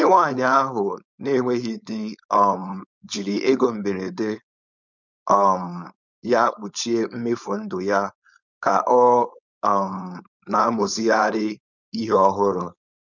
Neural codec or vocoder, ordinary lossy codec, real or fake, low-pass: codec, 16 kHz, 4.8 kbps, FACodec; none; fake; 7.2 kHz